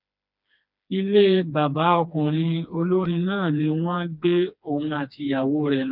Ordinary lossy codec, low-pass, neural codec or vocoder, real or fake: none; 5.4 kHz; codec, 16 kHz, 2 kbps, FreqCodec, smaller model; fake